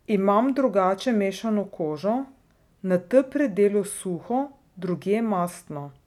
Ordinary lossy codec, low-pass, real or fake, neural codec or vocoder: none; 19.8 kHz; real; none